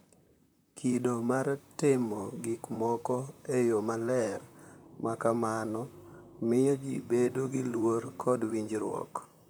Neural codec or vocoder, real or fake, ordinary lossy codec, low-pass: vocoder, 44.1 kHz, 128 mel bands, Pupu-Vocoder; fake; none; none